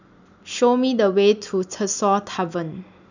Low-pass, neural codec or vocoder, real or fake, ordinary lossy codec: 7.2 kHz; none; real; none